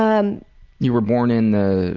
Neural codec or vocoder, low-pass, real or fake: none; 7.2 kHz; real